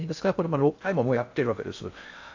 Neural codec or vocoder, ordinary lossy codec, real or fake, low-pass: codec, 16 kHz in and 24 kHz out, 0.6 kbps, FocalCodec, streaming, 2048 codes; AAC, 48 kbps; fake; 7.2 kHz